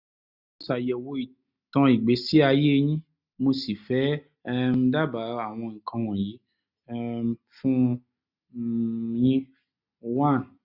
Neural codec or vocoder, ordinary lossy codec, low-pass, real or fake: none; none; 5.4 kHz; real